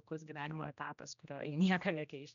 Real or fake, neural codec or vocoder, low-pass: fake; codec, 16 kHz, 1 kbps, X-Codec, HuBERT features, trained on general audio; 7.2 kHz